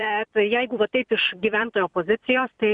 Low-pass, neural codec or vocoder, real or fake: 10.8 kHz; vocoder, 44.1 kHz, 128 mel bands every 256 samples, BigVGAN v2; fake